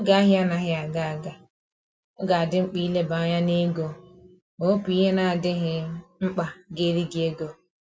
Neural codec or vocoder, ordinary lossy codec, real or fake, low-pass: none; none; real; none